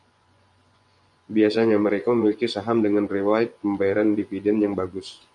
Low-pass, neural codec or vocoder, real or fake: 10.8 kHz; vocoder, 24 kHz, 100 mel bands, Vocos; fake